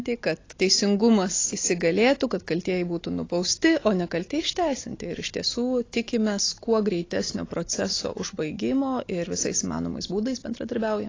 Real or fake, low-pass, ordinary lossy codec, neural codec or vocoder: real; 7.2 kHz; AAC, 32 kbps; none